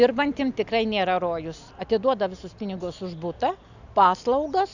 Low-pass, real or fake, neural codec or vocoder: 7.2 kHz; real; none